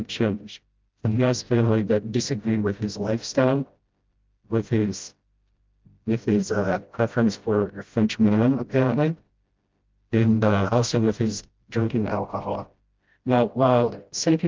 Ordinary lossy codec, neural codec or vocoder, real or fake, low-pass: Opus, 24 kbps; codec, 16 kHz, 0.5 kbps, FreqCodec, smaller model; fake; 7.2 kHz